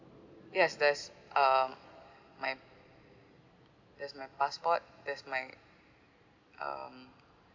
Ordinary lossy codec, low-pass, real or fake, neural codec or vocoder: AAC, 48 kbps; 7.2 kHz; real; none